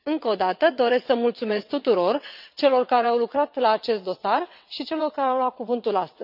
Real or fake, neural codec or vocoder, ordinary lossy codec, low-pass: fake; vocoder, 22.05 kHz, 80 mel bands, WaveNeXt; none; 5.4 kHz